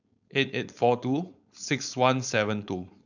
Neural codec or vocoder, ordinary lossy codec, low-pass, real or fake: codec, 16 kHz, 4.8 kbps, FACodec; none; 7.2 kHz; fake